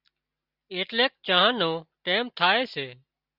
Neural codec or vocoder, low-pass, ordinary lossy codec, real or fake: none; 5.4 kHz; Opus, 64 kbps; real